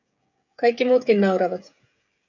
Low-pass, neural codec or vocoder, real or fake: 7.2 kHz; codec, 16 kHz, 16 kbps, FreqCodec, smaller model; fake